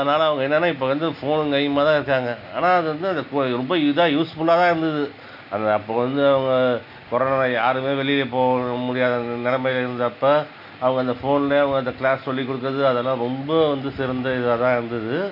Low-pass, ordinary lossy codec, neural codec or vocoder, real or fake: 5.4 kHz; none; none; real